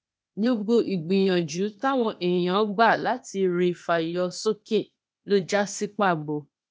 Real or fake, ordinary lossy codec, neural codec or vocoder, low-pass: fake; none; codec, 16 kHz, 0.8 kbps, ZipCodec; none